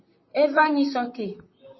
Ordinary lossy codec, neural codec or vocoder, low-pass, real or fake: MP3, 24 kbps; vocoder, 44.1 kHz, 128 mel bands, Pupu-Vocoder; 7.2 kHz; fake